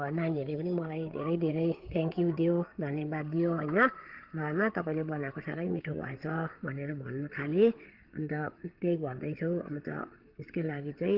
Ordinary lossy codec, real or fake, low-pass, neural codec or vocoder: Opus, 16 kbps; fake; 5.4 kHz; codec, 16 kHz, 8 kbps, FreqCodec, larger model